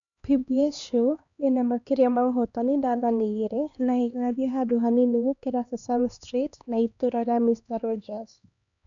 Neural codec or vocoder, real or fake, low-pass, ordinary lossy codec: codec, 16 kHz, 2 kbps, X-Codec, HuBERT features, trained on LibriSpeech; fake; 7.2 kHz; none